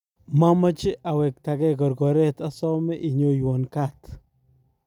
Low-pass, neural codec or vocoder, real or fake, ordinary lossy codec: 19.8 kHz; none; real; none